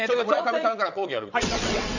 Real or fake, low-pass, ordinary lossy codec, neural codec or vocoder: fake; 7.2 kHz; none; vocoder, 22.05 kHz, 80 mel bands, WaveNeXt